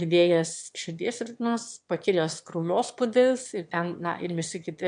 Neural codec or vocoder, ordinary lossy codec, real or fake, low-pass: autoencoder, 22.05 kHz, a latent of 192 numbers a frame, VITS, trained on one speaker; MP3, 64 kbps; fake; 9.9 kHz